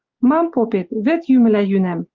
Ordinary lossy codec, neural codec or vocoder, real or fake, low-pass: Opus, 24 kbps; none; real; 7.2 kHz